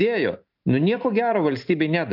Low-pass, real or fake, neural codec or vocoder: 5.4 kHz; real; none